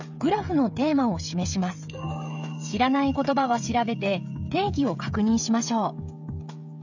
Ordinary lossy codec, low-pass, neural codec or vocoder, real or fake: none; 7.2 kHz; codec, 16 kHz, 16 kbps, FreqCodec, smaller model; fake